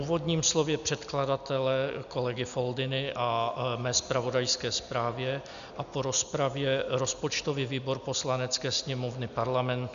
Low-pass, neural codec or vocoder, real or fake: 7.2 kHz; none; real